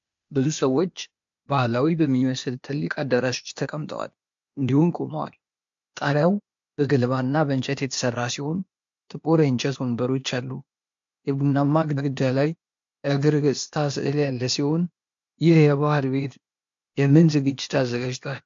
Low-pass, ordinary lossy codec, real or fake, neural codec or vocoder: 7.2 kHz; MP3, 48 kbps; fake; codec, 16 kHz, 0.8 kbps, ZipCodec